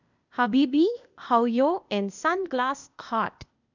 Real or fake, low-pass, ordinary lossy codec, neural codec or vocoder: fake; 7.2 kHz; none; codec, 16 kHz, 0.8 kbps, ZipCodec